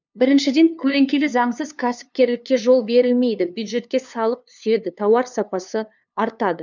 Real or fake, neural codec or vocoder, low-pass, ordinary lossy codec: fake; codec, 16 kHz, 2 kbps, FunCodec, trained on LibriTTS, 25 frames a second; 7.2 kHz; none